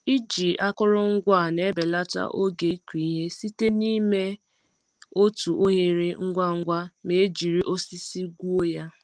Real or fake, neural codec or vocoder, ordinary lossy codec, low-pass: real; none; Opus, 24 kbps; 9.9 kHz